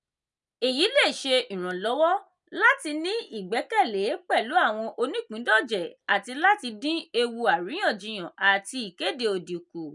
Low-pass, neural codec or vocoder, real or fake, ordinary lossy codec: 10.8 kHz; none; real; none